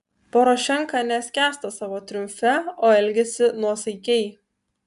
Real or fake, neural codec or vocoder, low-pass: real; none; 10.8 kHz